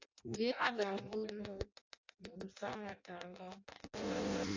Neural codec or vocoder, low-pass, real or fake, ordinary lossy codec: codec, 16 kHz in and 24 kHz out, 0.6 kbps, FireRedTTS-2 codec; 7.2 kHz; fake; AAC, 48 kbps